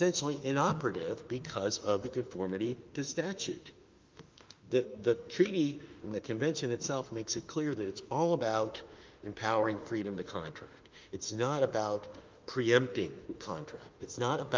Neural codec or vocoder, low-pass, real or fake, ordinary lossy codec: autoencoder, 48 kHz, 32 numbers a frame, DAC-VAE, trained on Japanese speech; 7.2 kHz; fake; Opus, 24 kbps